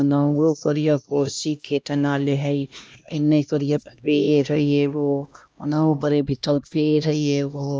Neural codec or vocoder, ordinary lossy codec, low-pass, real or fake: codec, 16 kHz, 1 kbps, X-Codec, HuBERT features, trained on LibriSpeech; none; none; fake